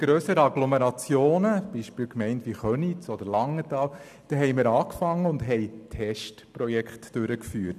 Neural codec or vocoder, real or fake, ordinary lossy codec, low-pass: none; real; none; 14.4 kHz